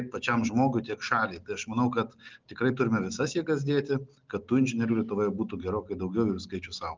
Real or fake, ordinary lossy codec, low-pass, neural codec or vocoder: real; Opus, 24 kbps; 7.2 kHz; none